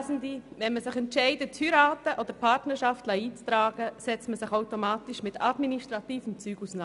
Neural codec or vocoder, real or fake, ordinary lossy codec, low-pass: none; real; none; 10.8 kHz